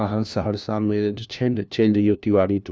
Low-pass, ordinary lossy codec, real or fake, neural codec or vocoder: none; none; fake; codec, 16 kHz, 1 kbps, FunCodec, trained on LibriTTS, 50 frames a second